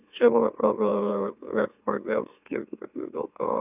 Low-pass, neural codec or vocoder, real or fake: 3.6 kHz; autoencoder, 44.1 kHz, a latent of 192 numbers a frame, MeloTTS; fake